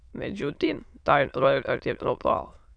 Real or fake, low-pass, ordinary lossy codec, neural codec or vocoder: fake; 9.9 kHz; MP3, 96 kbps; autoencoder, 22.05 kHz, a latent of 192 numbers a frame, VITS, trained on many speakers